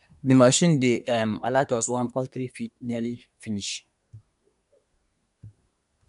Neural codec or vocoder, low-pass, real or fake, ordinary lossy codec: codec, 24 kHz, 1 kbps, SNAC; 10.8 kHz; fake; none